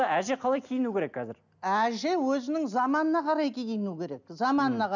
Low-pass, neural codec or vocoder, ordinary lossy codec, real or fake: 7.2 kHz; none; none; real